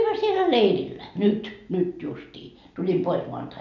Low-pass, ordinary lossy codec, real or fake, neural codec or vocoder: 7.2 kHz; none; real; none